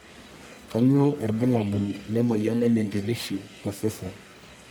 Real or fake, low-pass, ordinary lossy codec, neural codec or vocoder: fake; none; none; codec, 44.1 kHz, 1.7 kbps, Pupu-Codec